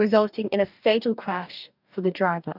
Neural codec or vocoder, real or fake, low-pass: codec, 44.1 kHz, 2.6 kbps, DAC; fake; 5.4 kHz